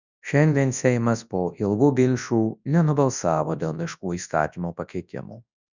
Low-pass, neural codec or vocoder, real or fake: 7.2 kHz; codec, 24 kHz, 0.9 kbps, WavTokenizer, large speech release; fake